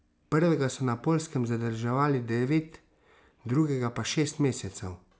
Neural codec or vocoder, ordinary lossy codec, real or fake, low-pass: none; none; real; none